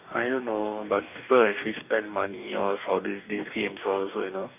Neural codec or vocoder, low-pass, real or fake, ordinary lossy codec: codec, 44.1 kHz, 2.6 kbps, DAC; 3.6 kHz; fake; none